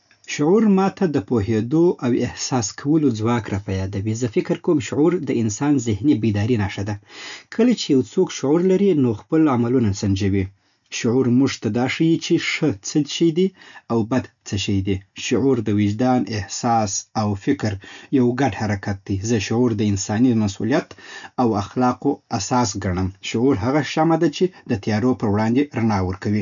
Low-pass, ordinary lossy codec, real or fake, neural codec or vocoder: 7.2 kHz; none; real; none